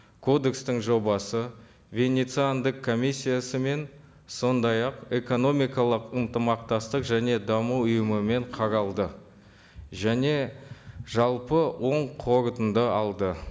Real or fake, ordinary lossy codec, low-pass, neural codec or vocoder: real; none; none; none